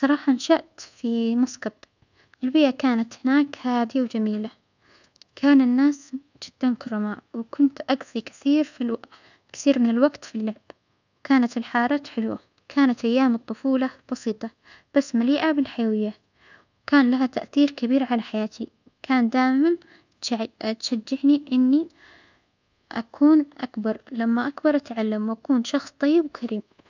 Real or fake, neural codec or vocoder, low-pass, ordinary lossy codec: fake; codec, 24 kHz, 1.2 kbps, DualCodec; 7.2 kHz; none